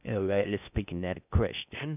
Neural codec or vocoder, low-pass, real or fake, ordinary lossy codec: codec, 16 kHz in and 24 kHz out, 0.6 kbps, FocalCodec, streaming, 4096 codes; 3.6 kHz; fake; none